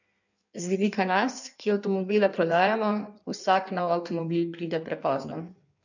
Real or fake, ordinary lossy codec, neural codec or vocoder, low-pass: fake; none; codec, 16 kHz in and 24 kHz out, 1.1 kbps, FireRedTTS-2 codec; 7.2 kHz